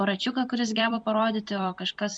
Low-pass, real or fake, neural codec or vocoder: 9.9 kHz; fake; vocoder, 44.1 kHz, 128 mel bands every 256 samples, BigVGAN v2